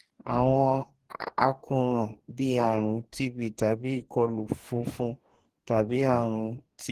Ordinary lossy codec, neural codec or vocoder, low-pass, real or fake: Opus, 16 kbps; codec, 44.1 kHz, 2.6 kbps, DAC; 14.4 kHz; fake